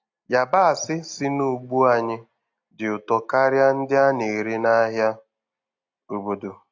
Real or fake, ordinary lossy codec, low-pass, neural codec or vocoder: real; AAC, 48 kbps; 7.2 kHz; none